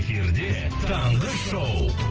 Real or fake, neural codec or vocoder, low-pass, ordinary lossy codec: real; none; 7.2 kHz; Opus, 16 kbps